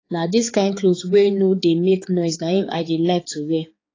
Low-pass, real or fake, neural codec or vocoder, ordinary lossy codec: 7.2 kHz; fake; codec, 16 kHz, 4 kbps, X-Codec, HuBERT features, trained on balanced general audio; AAC, 32 kbps